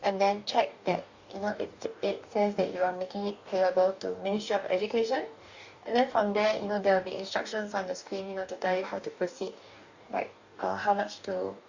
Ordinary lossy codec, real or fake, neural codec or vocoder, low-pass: Opus, 64 kbps; fake; codec, 44.1 kHz, 2.6 kbps, DAC; 7.2 kHz